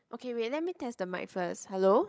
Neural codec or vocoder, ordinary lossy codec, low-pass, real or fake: codec, 16 kHz, 16 kbps, FreqCodec, larger model; none; none; fake